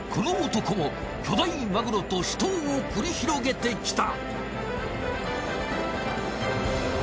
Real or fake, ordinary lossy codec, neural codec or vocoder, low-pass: real; none; none; none